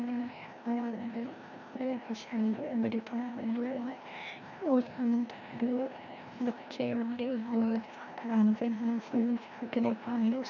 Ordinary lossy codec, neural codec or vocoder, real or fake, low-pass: none; codec, 16 kHz, 1 kbps, FreqCodec, larger model; fake; 7.2 kHz